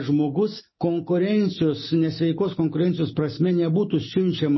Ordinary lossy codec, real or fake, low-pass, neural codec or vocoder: MP3, 24 kbps; real; 7.2 kHz; none